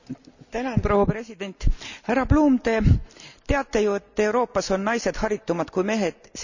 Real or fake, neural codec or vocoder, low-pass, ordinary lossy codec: real; none; 7.2 kHz; none